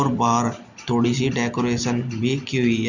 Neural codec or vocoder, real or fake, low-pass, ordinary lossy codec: none; real; 7.2 kHz; none